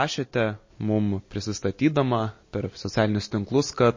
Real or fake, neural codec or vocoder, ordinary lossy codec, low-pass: real; none; MP3, 32 kbps; 7.2 kHz